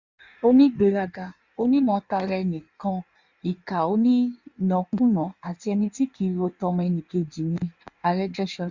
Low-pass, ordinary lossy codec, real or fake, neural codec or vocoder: 7.2 kHz; Opus, 64 kbps; fake; codec, 16 kHz in and 24 kHz out, 1.1 kbps, FireRedTTS-2 codec